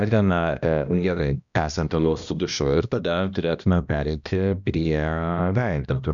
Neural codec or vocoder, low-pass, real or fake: codec, 16 kHz, 1 kbps, X-Codec, HuBERT features, trained on balanced general audio; 7.2 kHz; fake